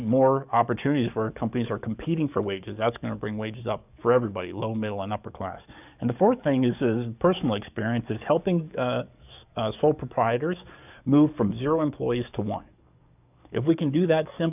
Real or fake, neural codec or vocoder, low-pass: fake; codec, 44.1 kHz, 7.8 kbps, DAC; 3.6 kHz